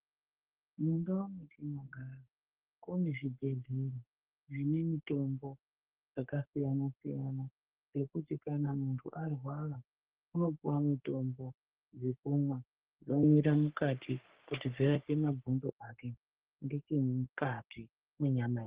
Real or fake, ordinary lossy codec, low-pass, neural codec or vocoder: fake; Opus, 16 kbps; 3.6 kHz; codec, 44.1 kHz, 3.4 kbps, Pupu-Codec